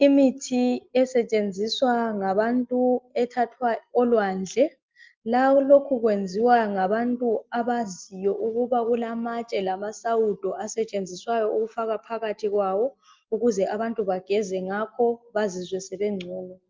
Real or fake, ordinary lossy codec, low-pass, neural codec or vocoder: real; Opus, 32 kbps; 7.2 kHz; none